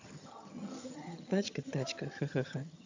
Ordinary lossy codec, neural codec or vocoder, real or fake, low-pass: AAC, 48 kbps; vocoder, 22.05 kHz, 80 mel bands, HiFi-GAN; fake; 7.2 kHz